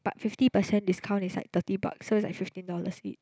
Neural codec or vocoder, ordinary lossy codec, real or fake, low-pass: none; none; real; none